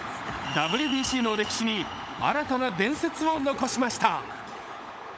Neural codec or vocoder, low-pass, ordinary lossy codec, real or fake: codec, 16 kHz, 16 kbps, FunCodec, trained on LibriTTS, 50 frames a second; none; none; fake